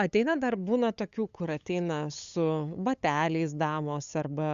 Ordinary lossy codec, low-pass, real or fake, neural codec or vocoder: Opus, 64 kbps; 7.2 kHz; fake; codec, 16 kHz, 8 kbps, FunCodec, trained on LibriTTS, 25 frames a second